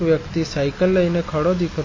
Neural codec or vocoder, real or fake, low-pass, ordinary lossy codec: none; real; 7.2 kHz; MP3, 32 kbps